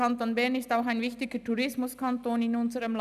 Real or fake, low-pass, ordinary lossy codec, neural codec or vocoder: real; 14.4 kHz; none; none